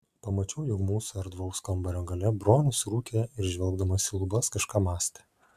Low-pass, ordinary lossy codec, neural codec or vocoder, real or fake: 14.4 kHz; AAC, 96 kbps; none; real